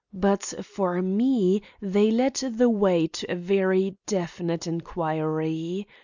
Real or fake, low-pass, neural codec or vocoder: real; 7.2 kHz; none